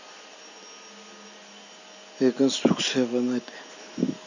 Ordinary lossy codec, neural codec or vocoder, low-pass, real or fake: none; none; 7.2 kHz; real